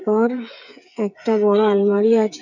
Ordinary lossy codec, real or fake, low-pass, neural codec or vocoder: none; fake; 7.2 kHz; codec, 16 kHz, 8 kbps, FreqCodec, smaller model